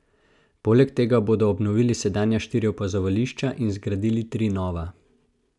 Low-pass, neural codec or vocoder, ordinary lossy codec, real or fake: 10.8 kHz; none; none; real